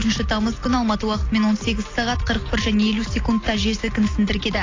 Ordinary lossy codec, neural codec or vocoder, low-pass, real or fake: AAC, 32 kbps; none; 7.2 kHz; real